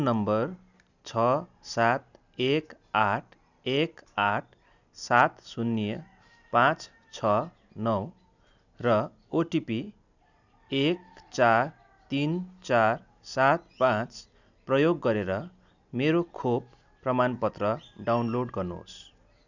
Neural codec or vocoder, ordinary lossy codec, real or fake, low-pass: none; none; real; 7.2 kHz